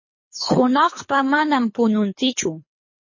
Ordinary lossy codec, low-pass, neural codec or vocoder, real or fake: MP3, 32 kbps; 7.2 kHz; codec, 24 kHz, 3 kbps, HILCodec; fake